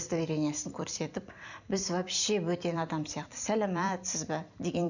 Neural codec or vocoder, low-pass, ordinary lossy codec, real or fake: vocoder, 44.1 kHz, 128 mel bands every 256 samples, BigVGAN v2; 7.2 kHz; none; fake